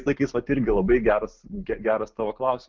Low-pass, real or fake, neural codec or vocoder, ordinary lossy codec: 7.2 kHz; real; none; Opus, 32 kbps